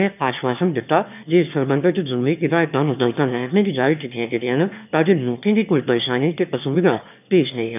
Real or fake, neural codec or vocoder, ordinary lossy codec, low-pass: fake; autoencoder, 22.05 kHz, a latent of 192 numbers a frame, VITS, trained on one speaker; none; 3.6 kHz